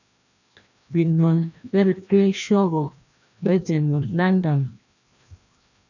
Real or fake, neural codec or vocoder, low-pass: fake; codec, 16 kHz, 1 kbps, FreqCodec, larger model; 7.2 kHz